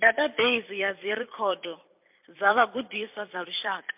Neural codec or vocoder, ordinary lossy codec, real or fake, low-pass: vocoder, 44.1 kHz, 128 mel bands every 256 samples, BigVGAN v2; MP3, 32 kbps; fake; 3.6 kHz